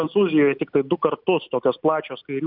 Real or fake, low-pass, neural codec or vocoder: real; 5.4 kHz; none